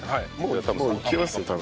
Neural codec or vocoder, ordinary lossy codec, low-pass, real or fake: none; none; none; real